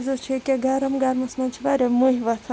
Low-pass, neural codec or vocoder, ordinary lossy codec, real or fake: none; none; none; real